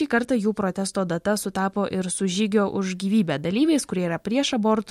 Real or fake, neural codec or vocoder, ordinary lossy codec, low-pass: real; none; MP3, 64 kbps; 19.8 kHz